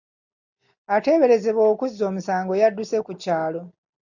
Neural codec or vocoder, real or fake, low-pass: none; real; 7.2 kHz